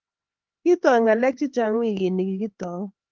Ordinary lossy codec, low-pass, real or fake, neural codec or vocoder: Opus, 16 kbps; 7.2 kHz; fake; codec, 16 kHz, 4 kbps, X-Codec, HuBERT features, trained on LibriSpeech